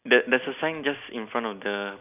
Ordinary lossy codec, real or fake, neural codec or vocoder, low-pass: none; real; none; 3.6 kHz